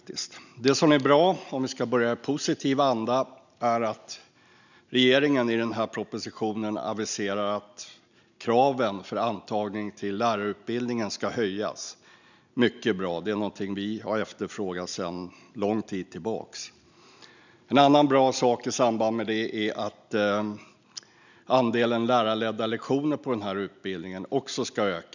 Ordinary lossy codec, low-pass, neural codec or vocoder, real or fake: none; 7.2 kHz; none; real